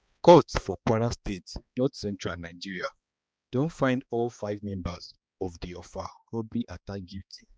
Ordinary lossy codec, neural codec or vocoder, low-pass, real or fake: none; codec, 16 kHz, 2 kbps, X-Codec, HuBERT features, trained on balanced general audio; none; fake